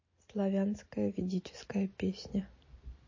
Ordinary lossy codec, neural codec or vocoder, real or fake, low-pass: MP3, 32 kbps; none; real; 7.2 kHz